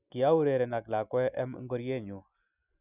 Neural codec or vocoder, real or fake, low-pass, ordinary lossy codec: none; real; 3.6 kHz; none